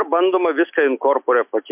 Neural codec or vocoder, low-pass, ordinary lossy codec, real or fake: none; 3.6 kHz; MP3, 32 kbps; real